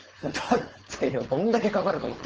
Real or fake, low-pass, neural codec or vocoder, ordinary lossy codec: fake; 7.2 kHz; codec, 16 kHz, 4.8 kbps, FACodec; Opus, 16 kbps